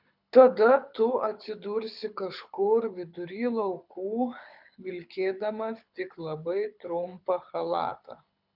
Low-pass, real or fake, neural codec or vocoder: 5.4 kHz; fake; codec, 24 kHz, 6 kbps, HILCodec